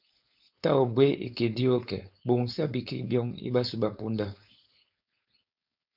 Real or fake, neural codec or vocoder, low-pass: fake; codec, 16 kHz, 4.8 kbps, FACodec; 5.4 kHz